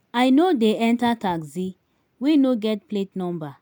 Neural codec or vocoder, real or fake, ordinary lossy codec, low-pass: none; real; none; 19.8 kHz